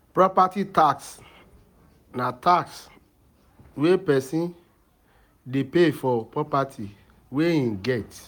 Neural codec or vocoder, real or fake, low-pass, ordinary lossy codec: none; real; none; none